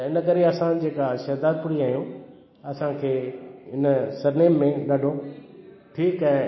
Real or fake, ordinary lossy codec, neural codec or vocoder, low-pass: real; MP3, 24 kbps; none; 7.2 kHz